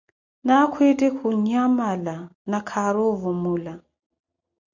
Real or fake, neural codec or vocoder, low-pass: real; none; 7.2 kHz